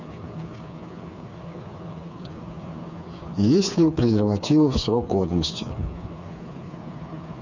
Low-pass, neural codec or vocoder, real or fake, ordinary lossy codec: 7.2 kHz; codec, 16 kHz, 4 kbps, FreqCodec, smaller model; fake; none